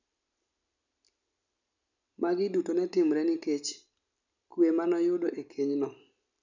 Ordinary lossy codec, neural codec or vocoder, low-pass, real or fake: none; none; 7.2 kHz; real